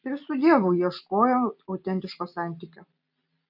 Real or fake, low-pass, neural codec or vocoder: real; 5.4 kHz; none